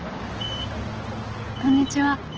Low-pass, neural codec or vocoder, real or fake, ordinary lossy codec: 7.2 kHz; none; real; Opus, 16 kbps